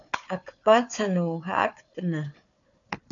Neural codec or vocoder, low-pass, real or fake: codec, 16 kHz, 4 kbps, FunCodec, trained on Chinese and English, 50 frames a second; 7.2 kHz; fake